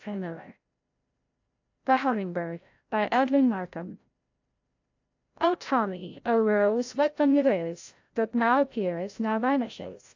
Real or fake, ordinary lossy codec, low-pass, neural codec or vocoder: fake; AAC, 48 kbps; 7.2 kHz; codec, 16 kHz, 0.5 kbps, FreqCodec, larger model